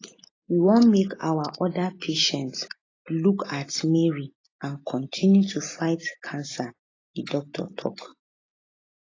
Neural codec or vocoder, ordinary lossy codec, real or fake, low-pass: none; AAC, 32 kbps; real; 7.2 kHz